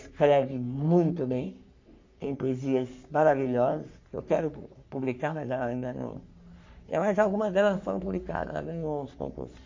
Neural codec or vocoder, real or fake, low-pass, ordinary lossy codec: codec, 44.1 kHz, 3.4 kbps, Pupu-Codec; fake; 7.2 kHz; MP3, 48 kbps